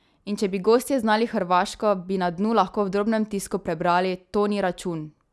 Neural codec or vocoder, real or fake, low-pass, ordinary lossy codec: none; real; none; none